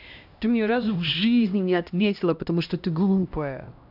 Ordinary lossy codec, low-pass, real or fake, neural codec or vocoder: none; 5.4 kHz; fake; codec, 16 kHz, 1 kbps, X-Codec, HuBERT features, trained on LibriSpeech